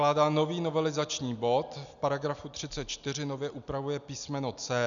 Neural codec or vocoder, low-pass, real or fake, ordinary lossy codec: none; 7.2 kHz; real; MP3, 96 kbps